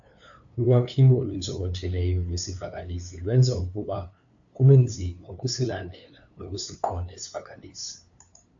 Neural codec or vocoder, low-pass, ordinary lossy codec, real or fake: codec, 16 kHz, 2 kbps, FunCodec, trained on LibriTTS, 25 frames a second; 7.2 kHz; AAC, 64 kbps; fake